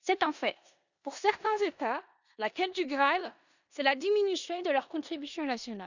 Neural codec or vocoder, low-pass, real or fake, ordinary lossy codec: codec, 16 kHz in and 24 kHz out, 0.9 kbps, LongCat-Audio-Codec, four codebook decoder; 7.2 kHz; fake; none